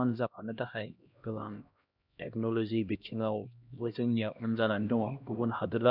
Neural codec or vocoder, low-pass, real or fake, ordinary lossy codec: codec, 16 kHz, 1 kbps, X-Codec, HuBERT features, trained on LibriSpeech; 5.4 kHz; fake; none